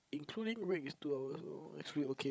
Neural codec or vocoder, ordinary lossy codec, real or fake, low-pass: codec, 16 kHz, 16 kbps, FreqCodec, larger model; none; fake; none